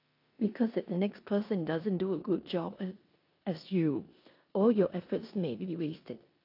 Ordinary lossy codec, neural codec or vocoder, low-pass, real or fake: AAC, 32 kbps; codec, 16 kHz in and 24 kHz out, 0.9 kbps, LongCat-Audio-Codec, four codebook decoder; 5.4 kHz; fake